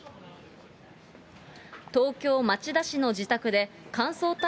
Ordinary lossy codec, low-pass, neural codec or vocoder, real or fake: none; none; none; real